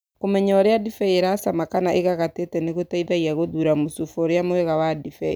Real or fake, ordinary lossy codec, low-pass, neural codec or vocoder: real; none; none; none